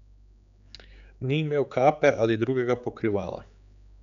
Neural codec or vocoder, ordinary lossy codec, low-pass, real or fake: codec, 16 kHz, 4 kbps, X-Codec, HuBERT features, trained on general audio; none; 7.2 kHz; fake